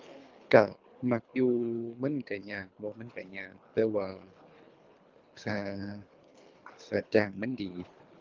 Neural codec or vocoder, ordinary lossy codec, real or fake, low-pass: codec, 24 kHz, 3 kbps, HILCodec; Opus, 24 kbps; fake; 7.2 kHz